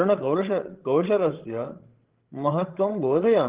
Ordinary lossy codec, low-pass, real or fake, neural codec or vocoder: Opus, 16 kbps; 3.6 kHz; fake; codec, 16 kHz, 16 kbps, FreqCodec, larger model